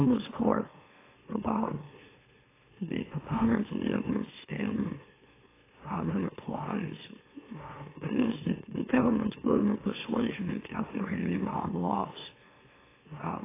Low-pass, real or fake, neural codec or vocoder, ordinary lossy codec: 3.6 kHz; fake; autoencoder, 44.1 kHz, a latent of 192 numbers a frame, MeloTTS; AAC, 16 kbps